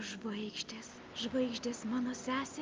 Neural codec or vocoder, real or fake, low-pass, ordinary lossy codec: none; real; 7.2 kHz; Opus, 32 kbps